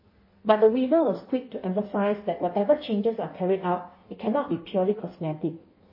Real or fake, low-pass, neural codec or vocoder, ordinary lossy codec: fake; 5.4 kHz; codec, 16 kHz in and 24 kHz out, 1.1 kbps, FireRedTTS-2 codec; MP3, 24 kbps